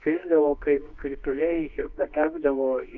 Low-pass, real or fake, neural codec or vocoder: 7.2 kHz; fake; codec, 24 kHz, 0.9 kbps, WavTokenizer, medium music audio release